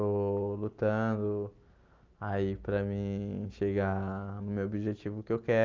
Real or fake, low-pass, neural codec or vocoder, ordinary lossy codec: real; 7.2 kHz; none; Opus, 24 kbps